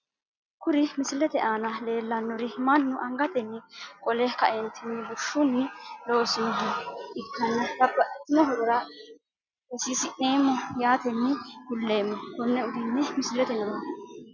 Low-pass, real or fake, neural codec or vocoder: 7.2 kHz; real; none